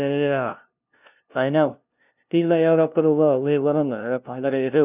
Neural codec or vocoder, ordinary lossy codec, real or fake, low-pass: codec, 16 kHz, 0.5 kbps, FunCodec, trained on LibriTTS, 25 frames a second; none; fake; 3.6 kHz